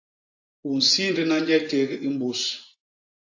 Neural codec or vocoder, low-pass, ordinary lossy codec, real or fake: vocoder, 24 kHz, 100 mel bands, Vocos; 7.2 kHz; AAC, 48 kbps; fake